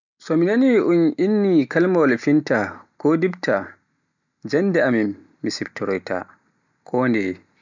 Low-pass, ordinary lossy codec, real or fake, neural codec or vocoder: 7.2 kHz; none; real; none